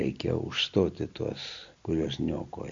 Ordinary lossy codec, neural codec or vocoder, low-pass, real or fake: MP3, 48 kbps; none; 7.2 kHz; real